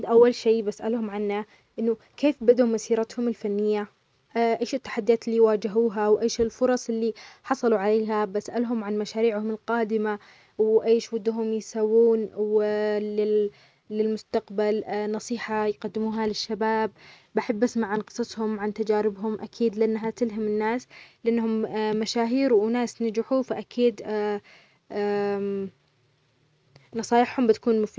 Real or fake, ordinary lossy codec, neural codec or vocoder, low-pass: real; none; none; none